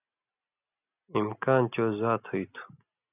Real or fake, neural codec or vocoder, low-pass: real; none; 3.6 kHz